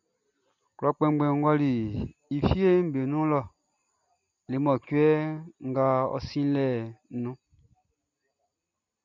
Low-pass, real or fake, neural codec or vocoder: 7.2 kHz; real; none